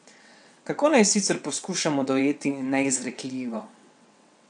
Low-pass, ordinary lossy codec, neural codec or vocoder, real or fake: 9.9 kHz; none; vocoder, 22.05 kHz, 80 mel bands, WaveNeXt; fake